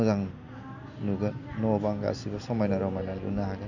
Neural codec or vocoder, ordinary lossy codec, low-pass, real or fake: none; none; 7.2 kHz; real